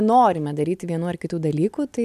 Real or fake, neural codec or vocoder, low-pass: real; none; 14.4 kHz